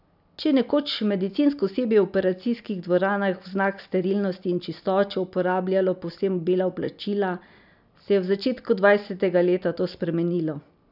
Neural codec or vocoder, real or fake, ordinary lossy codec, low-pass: none; real; none; 5.4 kHz